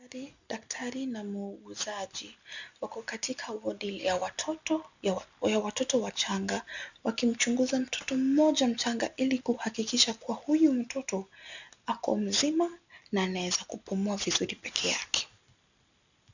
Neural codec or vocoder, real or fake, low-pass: none; real; 7.2 kHz